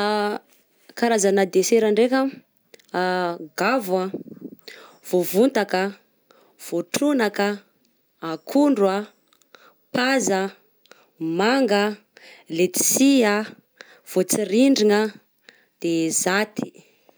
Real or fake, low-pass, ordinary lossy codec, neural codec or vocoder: real; none; none; none